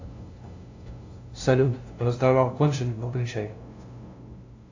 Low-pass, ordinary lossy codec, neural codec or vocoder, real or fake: 7.2 kHz; AAC, 48 kbps; codec, 16 kHz, 0.5 kbps, FunCodec, trained on LibriTTS, 25 frames a second; fake